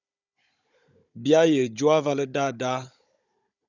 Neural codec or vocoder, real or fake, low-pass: codec, 16 kHz, 16 kbps, FunCodec, trained on Chinese and English, 50 frames a second; fake; 7.2 kHz